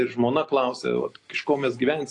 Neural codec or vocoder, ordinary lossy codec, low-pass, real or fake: none; AAC, 48 kbps; 10.8 kHz; real